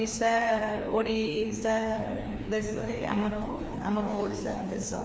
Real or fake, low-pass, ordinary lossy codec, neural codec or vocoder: fake; none; none; codec, 16 kHz, 2 kbps, FreqCodec, larger model